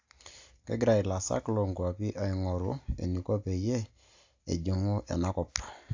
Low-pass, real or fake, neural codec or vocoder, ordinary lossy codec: 7.2 kHz; real; none; AAC, 48 kbps